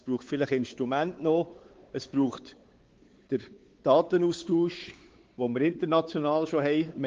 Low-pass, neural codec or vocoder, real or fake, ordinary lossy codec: 7.2 kHz; codec, 16 kHz, 4 kbps, X-Codec, WavLM features, trained on Multilingual LibriSpeech; fake; Opus, 16 kbps